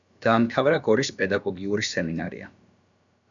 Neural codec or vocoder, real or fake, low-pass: codec, 16 kHz, about 1 kbps, DyCAST, with the encoder's durations; fake; 7.2 kHz